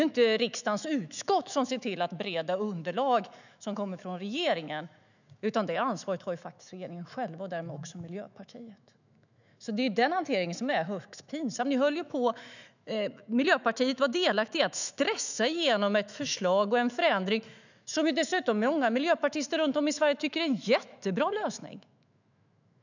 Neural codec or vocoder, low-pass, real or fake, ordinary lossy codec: autoencoder, 48 kHz, 128 numbers a frame, DAC-VAE, trained on Japanese speech; 7.2 kHz; fake; none